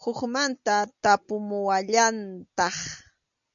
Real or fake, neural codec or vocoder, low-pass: real; none; 7.2 kHz